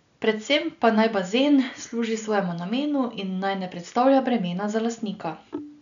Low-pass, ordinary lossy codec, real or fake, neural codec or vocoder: 7.2 kHz; none; real; none